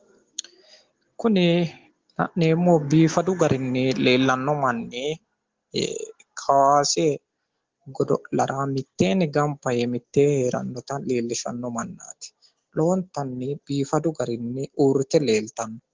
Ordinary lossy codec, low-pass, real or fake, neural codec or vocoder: Opus, 16 kbps; 7.2 kHz; real; none